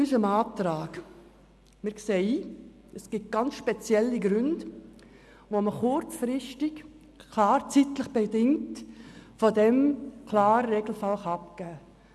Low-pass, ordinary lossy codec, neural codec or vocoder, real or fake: none; none; none; real